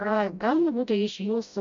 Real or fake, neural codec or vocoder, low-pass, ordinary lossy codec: fake; codec, 16 kHz, 0.5 kbps, FreqCodec, smaller model; 7.2 kHz; MP3, 64 kbps